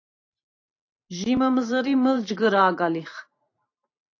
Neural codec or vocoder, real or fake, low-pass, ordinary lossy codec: vocoder, 44.1 kHz, 128 mel bands every 512 samples, BigVGAN v2; fake; 7.2 kHz; AAC, 48 kbps